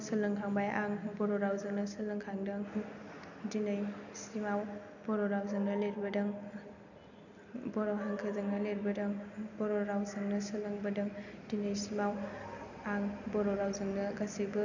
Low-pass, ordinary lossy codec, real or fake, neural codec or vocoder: 7.2 kHz; none; real; none